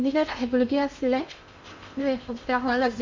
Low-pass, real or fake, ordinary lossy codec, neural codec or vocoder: 7.2 kHz; fake; MP3, 48 kbps; codec, 16 kHz in and 24 kHz out, 0.8 kbps, FocalCodec, streaming, 65536 codes